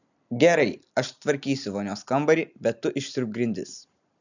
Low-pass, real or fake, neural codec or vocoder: 7.2 kHz; real; none